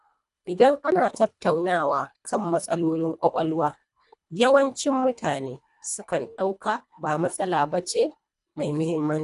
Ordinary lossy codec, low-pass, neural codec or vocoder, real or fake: none; 10.8 kHz; codec, 24 kHz, 1.5 kbps, HILCodec; fake